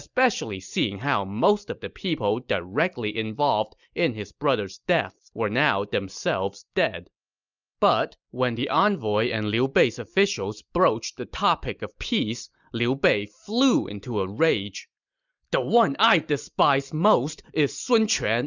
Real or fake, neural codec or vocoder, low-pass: real; none; 7.2 kHz